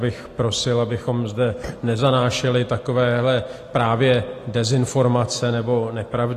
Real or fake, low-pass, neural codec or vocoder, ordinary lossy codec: real; 14.4 kHz; none; AAC, 48 kbps